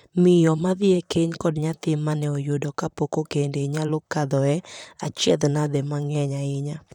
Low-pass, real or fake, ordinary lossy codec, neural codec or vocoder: 19.8 kHz; fake; none; vocoder, 44.1 kHz, 128 mel bands, Pupu-Vocoder